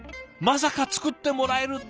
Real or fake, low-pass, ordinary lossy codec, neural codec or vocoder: real; none; none; none